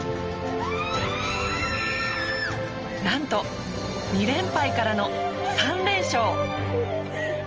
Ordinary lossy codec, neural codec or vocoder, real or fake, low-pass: Opus, 24 kbps; none; real; 7.2 kHz